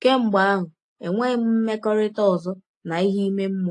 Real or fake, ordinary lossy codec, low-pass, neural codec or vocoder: real; AAC, 48 kbps; 10.8 kHz; none